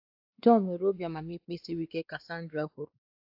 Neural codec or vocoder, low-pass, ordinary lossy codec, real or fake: codec, 16 kHz, 2 kbps, X-Codec, WavLM features, trained on Multilingual LibriSpeech; 5.4 kHz; AAC, 48 kbps; fake